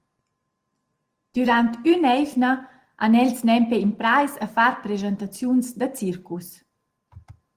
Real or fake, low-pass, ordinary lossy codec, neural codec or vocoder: real; 14.4 kHz; Opus, 24 kbps; none